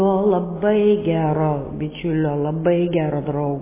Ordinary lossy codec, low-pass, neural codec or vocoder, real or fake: MP3, 16 kbps; 3.6 kHz; none; real